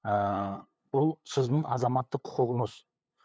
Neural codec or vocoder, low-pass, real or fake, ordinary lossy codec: codec, 16 kHz, 8 kbps, FunCodec, trained on LibriTTS, 25 frames a second; none; fake; none